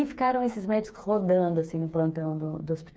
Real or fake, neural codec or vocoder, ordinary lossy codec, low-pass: fake; codec, 16 kHz, 4 kbps, FreqCodec, smaller model; none; none